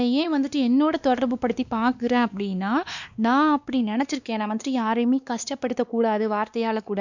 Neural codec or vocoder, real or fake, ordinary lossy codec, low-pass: codec, 16 kHz, 2 kbps, X-Codec, WavLM features, trained on Multilingual LibriSpeech; fake; none; 7.2 kHz